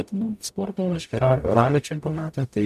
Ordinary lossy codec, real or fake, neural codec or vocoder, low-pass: MP3, 96 kbps; fake; codec, 44.1 kHz, 0.9 kbps, DAC; 14.4 kHz